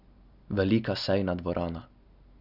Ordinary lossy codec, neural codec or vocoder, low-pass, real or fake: none; none; 5.4 kHz; real